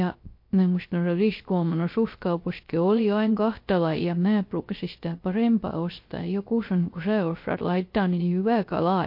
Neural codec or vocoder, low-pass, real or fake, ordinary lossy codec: codec, 16 kHz, 0.3 kbps, FocalCodec; 5.4 kHz; fake; MP3, 32 kbps